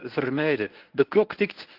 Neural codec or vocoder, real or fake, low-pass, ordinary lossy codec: codec, 24 kHz, 0.9 kbps, WavTokenizer, medium speech release version 1; fake; 5.4 kHz; Opus, 32 kbps